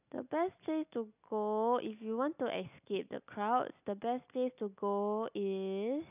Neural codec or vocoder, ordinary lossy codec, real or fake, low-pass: none; none; real; 3.6 kHz